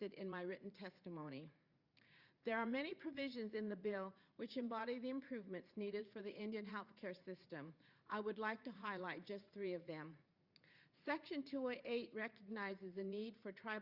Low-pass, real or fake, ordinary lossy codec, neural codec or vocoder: 5.4 kHz; fake; Opus, 64 kbps; vocoder, 44.1 kHz, 80 mel bands, Vocos